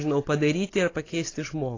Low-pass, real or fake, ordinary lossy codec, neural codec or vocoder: 7.2 kHz; real; AAC, 32 kbps; none